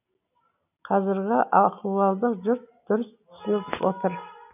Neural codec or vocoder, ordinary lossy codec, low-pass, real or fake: none; none; 3.6 kHz; real